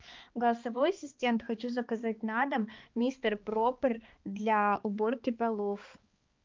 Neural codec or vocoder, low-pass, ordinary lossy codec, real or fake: codec, 16 kHz, 2 kbps, X-Codec, HuBERT features, trained on balanced general audio; 7.2 kHz; Opus, 24 kbps; fake